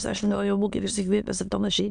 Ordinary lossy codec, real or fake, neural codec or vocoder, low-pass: MP3, 96 kbps; fake; autoencoder, 22.05 kHz, a latent of 192 numbers a frame, VITS, trained on many speakers; 9.9 kHz